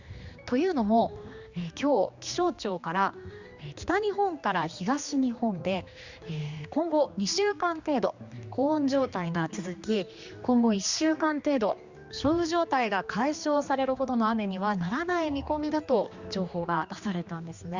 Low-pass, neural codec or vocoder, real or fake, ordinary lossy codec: 7.2 kHz; codec, 16 kHz, 2 kbps, X-Codec, HuBERT features, trained on general audio; fake; Opus, 64 kbps